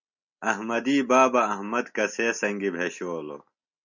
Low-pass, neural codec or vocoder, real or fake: 7.2 kHz; none; real